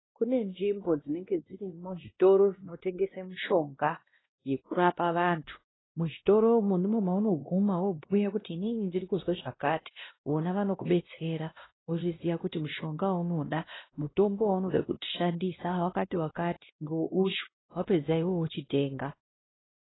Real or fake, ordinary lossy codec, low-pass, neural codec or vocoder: fake; AAC, 16 kbps; 7.2 kHz; codec, 16 kHz, 1 kbps, X-Codec, WavLM features, trained on Multilingual LibriSpeech